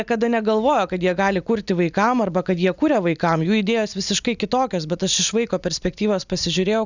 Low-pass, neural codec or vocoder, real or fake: 7.2 kHz; none; real